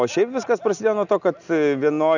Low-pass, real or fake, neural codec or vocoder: 7.2 kHz; real; none